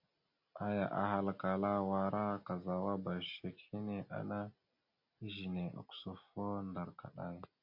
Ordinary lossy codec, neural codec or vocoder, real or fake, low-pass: MP3, 32 kbps; none; real; 5.4 kHz